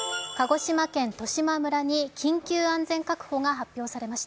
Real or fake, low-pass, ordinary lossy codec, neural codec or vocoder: real; none; none; none